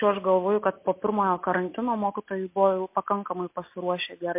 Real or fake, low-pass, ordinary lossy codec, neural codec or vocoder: real; 3.6 kHz; MP3, 24 kbps; none